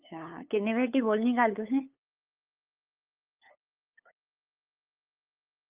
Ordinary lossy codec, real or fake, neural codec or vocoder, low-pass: Opus, 24 kbps; fake; codec, 16 kHz, 8 kbps, FunCodec, trained on LibriTTS, 25 frames a second; 3.6 kHz